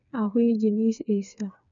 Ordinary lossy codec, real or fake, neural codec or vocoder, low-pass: none; fake; codec, 16 kHz, 4 kbps, FreqCodec, smaller model; 7.2 kHz